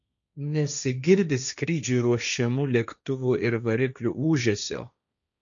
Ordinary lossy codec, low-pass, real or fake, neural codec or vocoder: MP3, 96 kbps; 7.2 kHz; fake; codec, 16 kHz, 1.1 kbps, Voila-Tokenizer